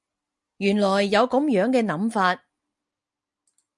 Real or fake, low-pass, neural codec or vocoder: real; 10.8 kHz; none